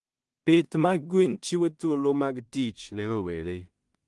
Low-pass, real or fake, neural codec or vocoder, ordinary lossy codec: 10.8 kHz; fake; codec, 16 kHz in and 24 kHz out, 0.4 kbps, LongCat-Audio-Codec, two codebook decoder; Opus, 24 kbps